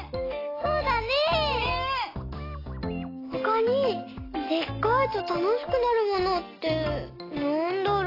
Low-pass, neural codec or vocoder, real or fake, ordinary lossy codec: 5.4 kHz; none; real; AAC, 24 kbps